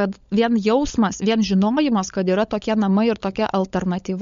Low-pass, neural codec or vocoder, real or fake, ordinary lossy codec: 7.2 kHz; codec, 16 kHz, 16 kbps, FunCodec, trained on LibriTTS, 50 frames a second; fake; MP3, 48 kbps